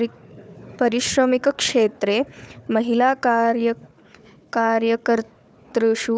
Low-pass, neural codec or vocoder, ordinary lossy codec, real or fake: none; codec, 16 kHz, 4 kbps, FunCodec, trained on Chinese and English, 50 frames a second; none; fake